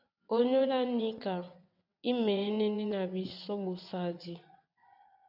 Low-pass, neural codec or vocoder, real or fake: 5.4 kHz; vocoder, 22.05 kHz, 80 mel bands, WaveNeXt; fake